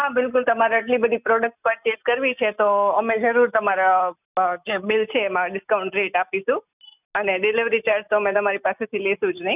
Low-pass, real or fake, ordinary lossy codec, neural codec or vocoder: 3.6 kHz; real; none; none